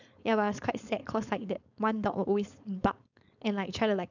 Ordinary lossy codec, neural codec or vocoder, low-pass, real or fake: none; codec, 16 kHz, 4.8 kbps, FACodec; 7.2 kHz; fake